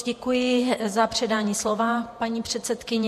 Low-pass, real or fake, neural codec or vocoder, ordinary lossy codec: 14.4 kHz; fake; vocoder, 48 kHz, 128 mel bands, Vocos; MP3, 64 kbps